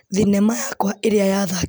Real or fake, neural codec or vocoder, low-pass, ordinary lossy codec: real; none; none; none